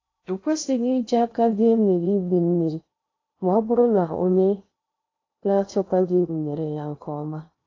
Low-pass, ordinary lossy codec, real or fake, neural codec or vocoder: 7.2 kHz; AAC, 32 kbps; fake; codec, 16 kHz in and 24 kHz out, 0.6 kbps, FocalCodec, streaming, 2048 codes